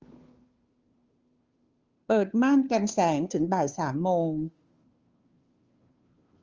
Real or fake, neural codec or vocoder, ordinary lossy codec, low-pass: fake; codec, 16 kHz, 2 kbps, FunCodec, trained on Chinese and English, 25 frames a second; Opus, 32 kbps; 7.2 kHz